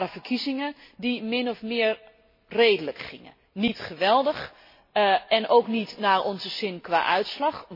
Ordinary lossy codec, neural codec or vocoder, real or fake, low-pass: MP3, 24 kbps; none; real; 5.4 kHz